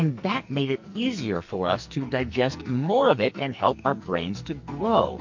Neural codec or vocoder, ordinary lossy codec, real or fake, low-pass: codec, 44.1 kHz, 2.6 kbps, SNAC; MP3, 48 kbps; fake; 7.2 kHz